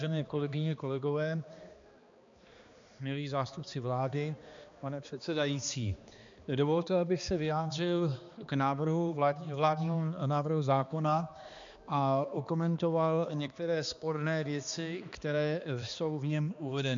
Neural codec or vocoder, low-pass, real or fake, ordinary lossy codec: codec, 16 kHz, 2 kbps, X-Codec, HuBERT features, trained on balanced general audio; 7.2 kHz; fake; MP3, 64 kbps